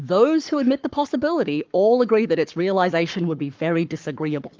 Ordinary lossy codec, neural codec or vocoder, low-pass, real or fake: Opus, 32 kbps; codec, 44.1 kHz, 7.8 kbps, Pupu-Codec; 7.2 kHz; fake